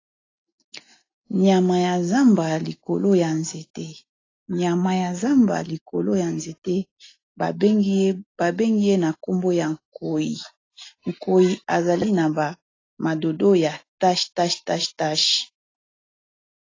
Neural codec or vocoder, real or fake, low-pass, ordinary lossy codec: none; real; 7.2 kHz; AAC, 32 kbps